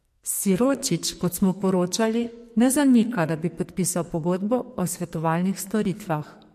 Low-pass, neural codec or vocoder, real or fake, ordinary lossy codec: 14.4 kHz; codec, 44.1 kHz, 2.6 kbps, SNAC; fake; MP3, 64 kbps